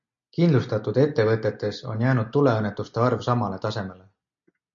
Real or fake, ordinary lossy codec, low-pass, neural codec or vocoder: real; MP3, 64 kbps; 7.2 kHz; none